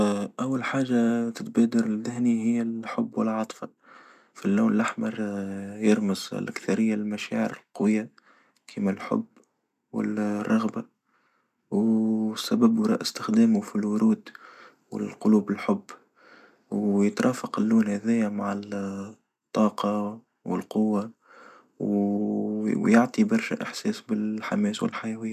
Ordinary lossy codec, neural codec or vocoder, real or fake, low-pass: none; none; real; 14.4 kHz